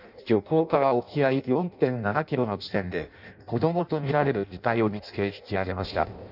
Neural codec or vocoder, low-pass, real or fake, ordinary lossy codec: codec, 16 kHz in and 24 kHz out, 0.6 kbps, FireRedTTS-2 codec; 5.4 kHz; fake; none